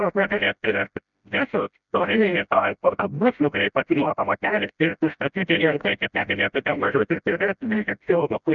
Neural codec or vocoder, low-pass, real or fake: codec, 16 kHz, 0.5 kbps, FreqCodec, smaller model; 7.2 kHz; fake